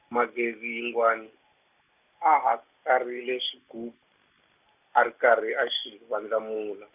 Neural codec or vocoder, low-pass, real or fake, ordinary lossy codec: none; 3.6 kHz; real; none